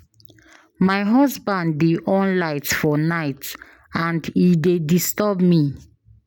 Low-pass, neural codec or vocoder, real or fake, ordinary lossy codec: none; none; real; none